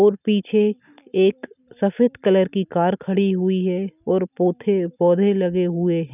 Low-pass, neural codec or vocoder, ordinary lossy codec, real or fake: 3.6 kHz; none; none; real